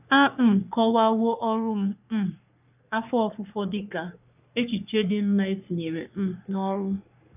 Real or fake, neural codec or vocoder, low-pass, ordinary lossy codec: fake; codec, 44.1 kHz, 3.4 kbps, Pupu-Codec; 3.6 kHz; none